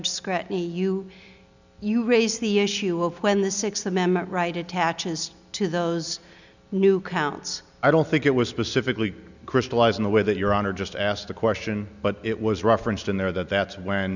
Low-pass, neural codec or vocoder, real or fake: 7.2 kHz; none; real